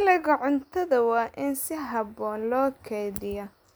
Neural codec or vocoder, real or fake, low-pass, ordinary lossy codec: none; real; none; none